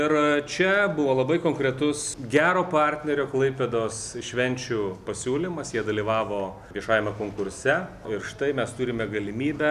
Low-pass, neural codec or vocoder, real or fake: 14.4 kHz; none; real